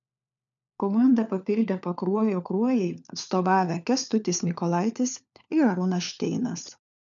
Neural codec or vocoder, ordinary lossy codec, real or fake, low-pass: codec, 16 kHz, 4 kbps, FunCodec, trained on LibriTTS, 50 frames a second; MP3, 96 kbps; fake; 7.2 kHz